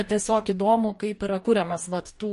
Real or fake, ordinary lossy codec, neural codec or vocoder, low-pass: fake; MP3, 48 kbps; codec, 44.1 kHz, 2.6 kbps, DAC; 14.4 kHz